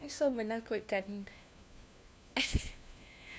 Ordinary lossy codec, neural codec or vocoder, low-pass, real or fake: none; codec, 16 kHz, 1 kbps, FunCodec, trained on LibriTTS, 50 frames a second; none; fake